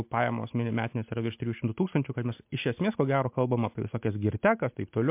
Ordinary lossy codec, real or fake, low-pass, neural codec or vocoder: MP3, 32 kbps; real; 3.6 kHz; none